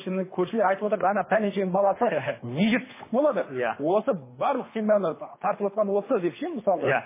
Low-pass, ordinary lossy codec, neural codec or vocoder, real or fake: 3.6 kHz; MP3, 16 kbps; codec, 24 kHz, 3 kbps, HILCodec; fake